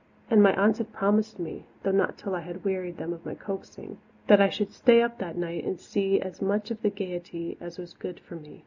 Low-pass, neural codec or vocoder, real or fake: 7.2 kHz; none; real